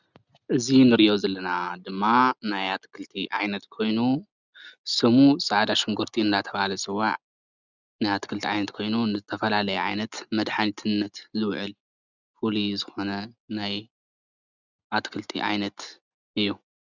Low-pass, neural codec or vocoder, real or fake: 7.2 kHz; none; real